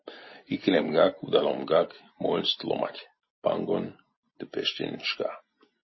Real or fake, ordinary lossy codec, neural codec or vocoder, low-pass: real; MP3, 24 kbps; none; 7.2 kHz